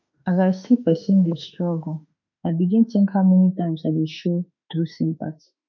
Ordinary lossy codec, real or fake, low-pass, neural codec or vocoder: none; fake; 7.2 kHz; autoencoder, 48 kHz, 32 numbers a frame, DAC-VAE, trained on Japanese speech